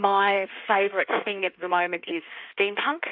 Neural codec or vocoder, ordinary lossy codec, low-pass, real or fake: codec, 16 kHz in and 24 kHz out, 1.1 kbps, FireRedTTS-2 codec; AAC, 48 kbps; 5.4 kHz; fake